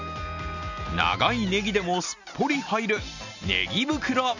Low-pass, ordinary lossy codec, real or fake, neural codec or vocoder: 7.2 kHz; none; real; none